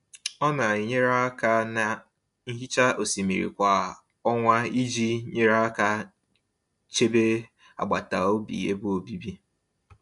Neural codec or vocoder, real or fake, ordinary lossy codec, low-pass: none; real; MP3, 64 kbps; 10.8 kHz